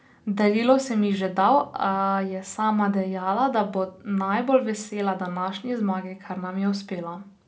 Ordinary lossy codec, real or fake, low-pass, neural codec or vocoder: none; real; none; none